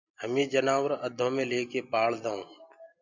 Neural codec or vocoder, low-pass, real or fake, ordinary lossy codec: none; 7.2 kHz; real; MP3, 64 kbps